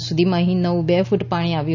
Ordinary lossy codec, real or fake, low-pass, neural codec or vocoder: none; real; 7.2 kHz; none